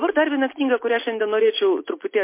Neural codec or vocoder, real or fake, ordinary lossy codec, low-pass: none; real; MP3, 24 kbps; 3.6 kHz